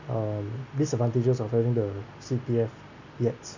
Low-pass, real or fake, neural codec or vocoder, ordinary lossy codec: 7.2 kHz; real; none; none